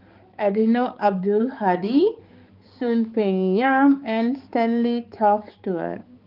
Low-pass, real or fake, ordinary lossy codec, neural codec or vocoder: 5.4 kHz; fake; Opus, 32 kbps; codec, 16 kHz, 4 kbps, X-Codec, HuBERT features, trained on balanced general audio